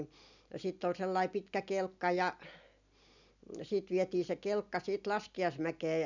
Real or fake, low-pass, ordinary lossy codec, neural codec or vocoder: real; 7.2 kHz; none; none